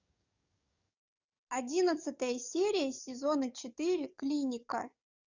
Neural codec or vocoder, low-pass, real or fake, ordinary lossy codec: codec, 44.1 kHz, 7.8 kbps, DAC; 7.2 kHz; fake; Opus, 64 kbps